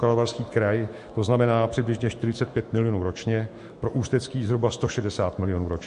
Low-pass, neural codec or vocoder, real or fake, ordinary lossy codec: 14.4 kHz; autoencoder, 48 kHz, 128 numbers a frame, DAC-VAE, trained on Japanese speech; fake; MP3, 48 kbps